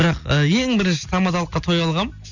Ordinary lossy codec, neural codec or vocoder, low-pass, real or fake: none; none; 7.2 kHz; real